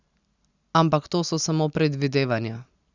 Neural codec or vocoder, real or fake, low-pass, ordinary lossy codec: none; real; 7.2 kHz; Opus, 64 kbps